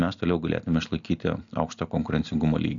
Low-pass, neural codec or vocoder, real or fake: 7.2 kHz; none; real